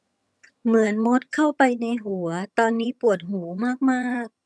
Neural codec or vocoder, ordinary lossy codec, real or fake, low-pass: vocoder, 22.05 kHz, 80 mel bands, HiFi-GAN; none; fake; none